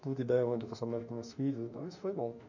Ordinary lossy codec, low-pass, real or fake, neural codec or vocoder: none; 7.2 kHz; fake; autoencoder, 48 kHz, 32 numbers a frame, DAC-VAE, trained on Japanese speech